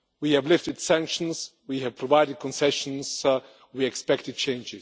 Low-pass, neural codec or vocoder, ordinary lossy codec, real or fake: none; none; none; real